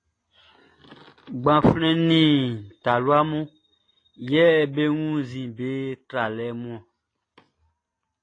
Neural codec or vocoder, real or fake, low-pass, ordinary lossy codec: none; real; 9.9 kHz; AAC, 32 kbps